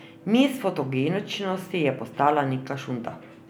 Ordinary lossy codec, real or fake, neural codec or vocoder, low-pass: none; real; none; none